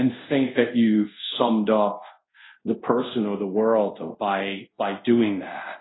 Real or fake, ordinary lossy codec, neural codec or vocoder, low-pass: fake; AAC, 16 kbps; codec, 24 kHz, 0.5 kbps, DualCodec; 7.2 kHz